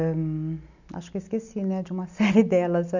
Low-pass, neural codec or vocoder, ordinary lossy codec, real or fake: 7.2 kHz; none; none; real